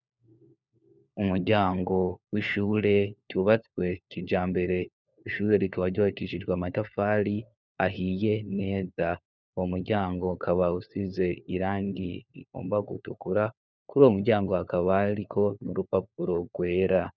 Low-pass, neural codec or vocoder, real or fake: 7.2 kHz; codec, 16 kHz, 4 kbps, FunCodec, trained on LibriTTS, 50 frames a second; fake